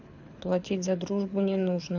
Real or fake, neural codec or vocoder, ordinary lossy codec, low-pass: fake; codec, 24 kHz, 6 kbps, HILCodec; AAC, 48 kbps; 7.2 kHz